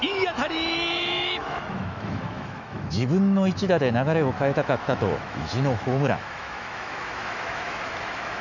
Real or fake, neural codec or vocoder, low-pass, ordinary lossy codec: fake; autoencoder, 48 kHz, 128 numbers a frame, DAC-VAE, trained on Japanese speech; 7.2 kHz; none